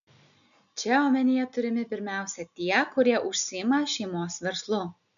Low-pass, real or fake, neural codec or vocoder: 7.2 kHz; real; none